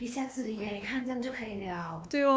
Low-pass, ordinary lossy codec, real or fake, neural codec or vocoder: none; none; fake; codec, 16 kHz, 2 kbps, X-Codec, WavLM features, trained on Multilingual LibriSpeech